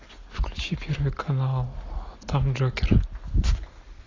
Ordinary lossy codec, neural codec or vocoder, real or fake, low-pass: AAC, 32 kbps; none; real; 7.2 kHz